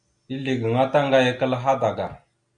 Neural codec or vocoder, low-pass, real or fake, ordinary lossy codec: none; 9.9 kHz; real; Opus, 64 kbps